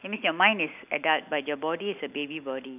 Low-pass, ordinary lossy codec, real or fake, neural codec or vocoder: 3.6 kHz; none; real; none